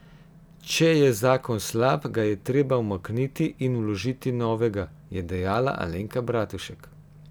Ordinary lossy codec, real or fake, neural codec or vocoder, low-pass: none; real; none; none